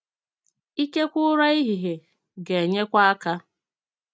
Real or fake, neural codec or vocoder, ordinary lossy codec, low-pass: real; none; none; none